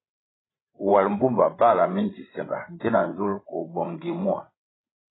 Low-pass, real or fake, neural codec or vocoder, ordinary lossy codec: 7.2 kHz; fake; codec, 16 kHz, 16 kbps, FreqCodec, larger model; AAC, 16 kbps